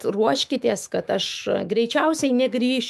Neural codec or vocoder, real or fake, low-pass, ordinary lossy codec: autoencoder, 48 kHz, 128 numbers a frame, DAC-VAE, trained on Japanese speech; fake; 14.4 kHz; MP3, 96 kbps